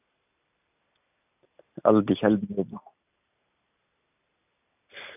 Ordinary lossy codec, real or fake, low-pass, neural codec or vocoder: none; real; 3.6 kHz; none